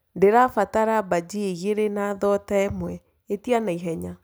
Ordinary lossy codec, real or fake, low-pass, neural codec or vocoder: none; real; none; none